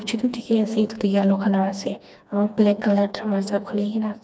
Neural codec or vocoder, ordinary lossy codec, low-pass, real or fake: codec, 16 kHz, 2 kbps, FreqCodec, smaller model; none; none; fake